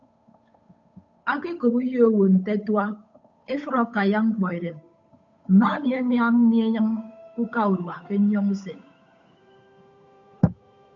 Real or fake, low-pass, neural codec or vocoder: fake; 7.2 kHz; codec, 16 kHz, 8 kbps, FunCodec, trained on Chinese and English, 25 frames a second